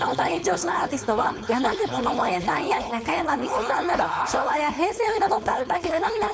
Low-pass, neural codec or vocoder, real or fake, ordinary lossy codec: none; codec, 16 kHz, 4.8 kbps, FACodec; fake; none